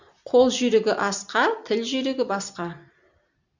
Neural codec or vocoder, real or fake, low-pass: none; real; 7.2 kHz